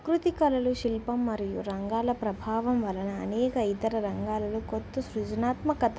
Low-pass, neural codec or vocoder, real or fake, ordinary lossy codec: none; none; real; none